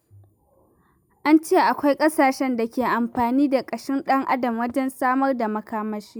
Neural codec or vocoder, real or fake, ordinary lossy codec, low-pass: none; real; none; none